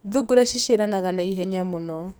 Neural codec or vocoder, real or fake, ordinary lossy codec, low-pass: codec, 44.1 kHz, 2.6 kbps, SNAC; fake; none; none